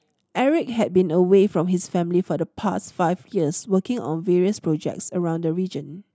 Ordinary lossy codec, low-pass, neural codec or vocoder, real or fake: none; none; none; real